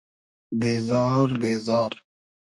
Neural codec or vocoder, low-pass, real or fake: codec, 44.1 kHz, 2.6 kbps, DAC; 10.8 kHz; fake